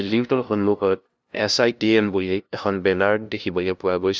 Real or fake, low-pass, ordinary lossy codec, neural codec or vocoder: fake; none; none; codec, 16 kHz, 0.5 kbps, FunCodec, trained on LibriTTS, 25 frames a second